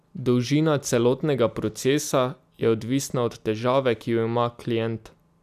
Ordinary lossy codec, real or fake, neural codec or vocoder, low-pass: none; real; none; 14.4 kHz